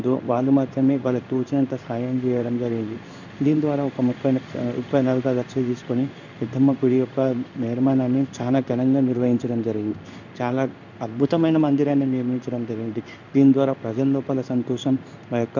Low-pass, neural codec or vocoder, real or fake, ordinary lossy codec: 7.2 kHz; codec, 16 kHz in and 24 kHz out, 1 kbps, XY-Tokenizer; fake; none